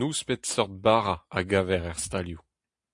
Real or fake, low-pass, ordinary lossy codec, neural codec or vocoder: real; 10.8 kHz; AAC, 64 kbps; none